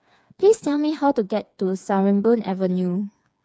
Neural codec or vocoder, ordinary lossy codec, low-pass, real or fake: codec, 16 kHz, 4 kbps, FreqCodec, smaller model; none; none; fake